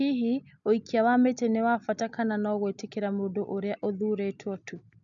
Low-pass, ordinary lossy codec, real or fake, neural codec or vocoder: 7.2 kHz; none; real; none